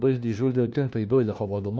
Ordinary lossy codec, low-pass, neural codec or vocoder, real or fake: none; none; codec, 16 kHz, 1 kbps, FunCodec, trained on LibriTTS, 50 frames a second; fake